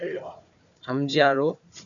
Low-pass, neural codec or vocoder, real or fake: 7.2 kHz; codec, 16 kHz, 4 kbps, FunCodec, trained on Chinese and English, 50 frames a second; fake